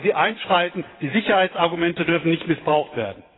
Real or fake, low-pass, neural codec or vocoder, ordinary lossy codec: fake; 7.2 kHz; vocoder, 22.05 kHz, 80 mel bands, WaveNeXt; AAC, 16 kbps